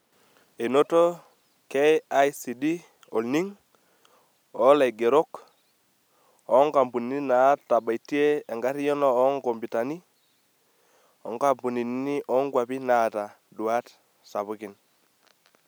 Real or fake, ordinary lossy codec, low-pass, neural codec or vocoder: real; none; none; none